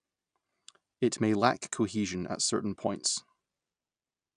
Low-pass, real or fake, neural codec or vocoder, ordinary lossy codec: 9.9 kHz; real; none; none